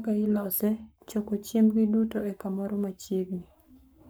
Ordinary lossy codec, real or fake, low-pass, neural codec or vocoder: none; fake; none; codec, 44.1 kHz, 7.8 kbps, Pupu-Codec